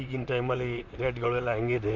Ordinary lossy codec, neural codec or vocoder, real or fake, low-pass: MP3, 48 kbps; vocoder, 44.1 kHz, 128 mel bands, Pupu-Vocoder; fake; 7.2 kHz